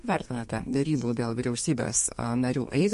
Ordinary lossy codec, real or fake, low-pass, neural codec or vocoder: MP3, 48 kbps; fake; 14.4 kHz; codec, 32 kHz, 1.9 kbps, SNAC